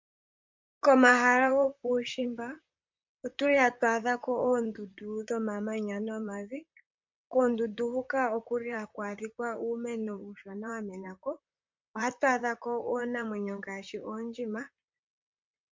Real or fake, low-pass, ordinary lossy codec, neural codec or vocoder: fake; 7.2 kHz; MP3, 64 kbps; vocoder, 44.1 kHz, 128 mel bands, Pupu-Vocoder